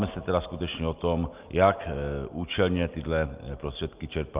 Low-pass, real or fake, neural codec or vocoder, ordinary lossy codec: 3.6 kHz; real; none; Opus, 32 kbps